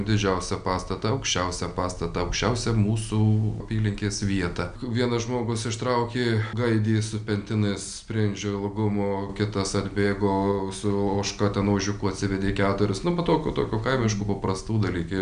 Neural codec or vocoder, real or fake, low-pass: none; real; 9.9 kHz